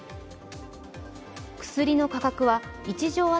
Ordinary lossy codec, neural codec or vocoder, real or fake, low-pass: none; none; real; none